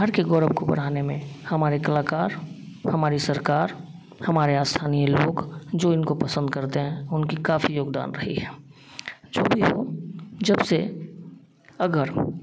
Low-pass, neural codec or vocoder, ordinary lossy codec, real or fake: none; none; none; real